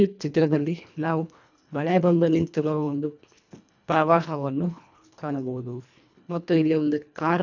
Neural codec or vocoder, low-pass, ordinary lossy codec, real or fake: codec, 24 kHz, 1.5 kbps, HILCodec; 7.2 kHz; none; fake